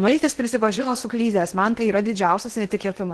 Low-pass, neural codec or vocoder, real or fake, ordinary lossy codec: 10.8 kHz; codec, 16 kHz in and 24 kHz out, 0.8 kbps, FocalCodec, streaming, 65536 codes; fake; Opus, 16 kbps